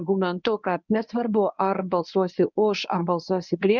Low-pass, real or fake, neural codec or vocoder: 7.2 kHz; fake; codec, 24 kHz, 0.9 kbps, WavTokenizer, medium speech release version 1